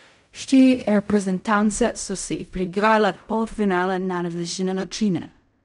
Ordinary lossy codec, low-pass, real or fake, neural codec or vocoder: none; 10.8 kHz; fake; codec, 16 kHz in and 24 kHz out, 0.4 kbps, LongCat-Audio-Codec, fine tuned four codebook decoder